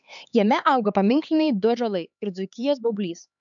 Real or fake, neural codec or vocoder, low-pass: fake; codec, 16 kHz, 4 kbps, X-Codec, HuBERT features, trained on LibriSpeech; 7.2 kHz